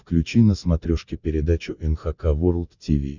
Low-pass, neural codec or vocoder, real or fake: 7.2 kHz; none; real